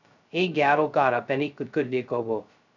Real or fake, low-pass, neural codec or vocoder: fake; 7.2 kHz; codec, 16 kHz, 0.2 kbps, FocalCodec